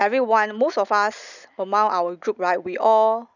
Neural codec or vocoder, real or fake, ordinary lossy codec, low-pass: none; real; none; 7.2 kHz